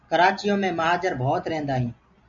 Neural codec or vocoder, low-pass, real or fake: none; 7.2 kHz; real